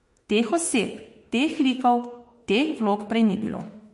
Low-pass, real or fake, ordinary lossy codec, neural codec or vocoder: 14.4 kHz; fake; MP3, 48 kbps; autoencoder, 48 kHz, 32 numbers a frame, DAC-VAE, trained on Japanese speech